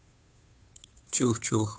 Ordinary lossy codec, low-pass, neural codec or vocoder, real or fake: none; none; codec, 16 kHz, 2 kbps, FunCodec, trained on Chinese and English, 25 frames a second; fake